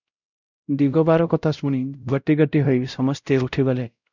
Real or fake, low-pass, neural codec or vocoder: fake; 7.2 kHz; codec, 16 kHz, 0.5 kbps, X-Codec, WavLM features, trained on Multilingual LibriSpeech